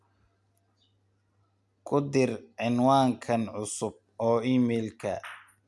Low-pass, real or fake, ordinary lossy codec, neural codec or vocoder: none; real; none; none